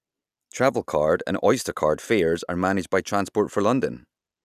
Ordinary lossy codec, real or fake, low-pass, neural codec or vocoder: none; real; 14.4 kHz; none